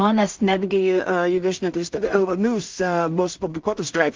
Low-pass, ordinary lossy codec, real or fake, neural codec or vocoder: 7.2 kHz; Opus, 32 kbps; fake; codec, 16 kHz in and 24 kHz out, 0.4 kbps, LongCat-Audio-Codec, two codebook decoder